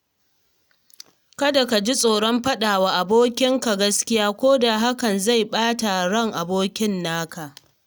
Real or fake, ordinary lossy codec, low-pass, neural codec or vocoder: real; none; none; none